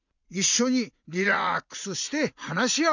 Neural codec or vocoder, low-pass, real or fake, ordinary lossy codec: vocoder, 44.1 kHz, 80 mel bands, Vocos; 7.2 kHz; fake; none